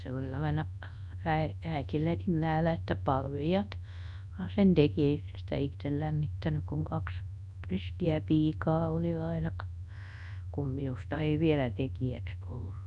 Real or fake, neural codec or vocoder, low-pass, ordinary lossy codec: fake; codec, 24 kHz, 0.9 kbps, WavTokenizer, large speech release; 10.8 kHz; none